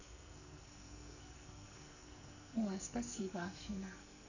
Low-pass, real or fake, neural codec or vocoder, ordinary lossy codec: 7.2 kHz; fake; codec, 44.1 kHz, 2.6 kbps, SNAC; none